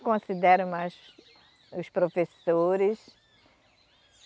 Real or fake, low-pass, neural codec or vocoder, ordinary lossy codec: real; none; none; none